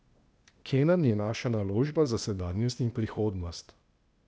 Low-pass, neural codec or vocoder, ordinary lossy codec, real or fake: none; codec, 16 kHz, 0.8 kbps, ZipCodec; none; fake